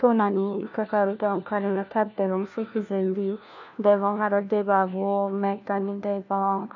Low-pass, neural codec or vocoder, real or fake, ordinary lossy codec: 7.2 kHz; codec, 16 kHz, 1 kbps, FunCodec, trained on LibriTTS, 50 frames a second; fake; none